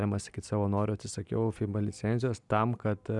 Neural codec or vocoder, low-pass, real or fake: none; 10.8 kHz; real